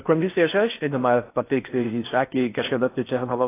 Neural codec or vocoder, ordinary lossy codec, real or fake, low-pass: codec, 16 kHz in and 24 kHz out, 0.6 kbps, FocalCodec, streaming, 4096 codes; AAC, 24 kbps; fake; 3.6 kHz